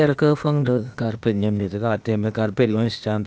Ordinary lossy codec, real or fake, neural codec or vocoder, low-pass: none; fake; codec, 16 kHz, 0.8 kbps, ZipCodec; none